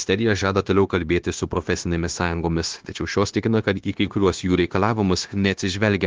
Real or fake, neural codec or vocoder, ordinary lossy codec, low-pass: fake; codec, 16 kHz, 0.9 kbps, LongCat-Audio-Codec; Opus, 16 kbps; 7.2 kHz